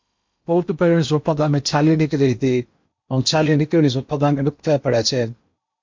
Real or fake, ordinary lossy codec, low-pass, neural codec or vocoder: fake; MP3, 48 kbps; 7.2 kHz; codec, 16 kHz in and 24 kHz out, 0.8 kbps, FocalCodec, streaming, 65536 codes